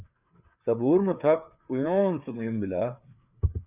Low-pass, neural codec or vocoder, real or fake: 3.6 kHz; codec, 16 kHz, 4 kbps, FreqCodec, larger model; fake